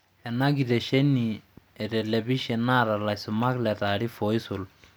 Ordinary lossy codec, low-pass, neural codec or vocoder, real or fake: none; none; none; real